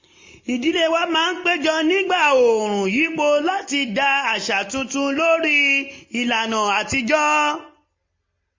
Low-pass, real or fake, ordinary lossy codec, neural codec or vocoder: 7.2 kHz; real; MP3, 32 kbps; none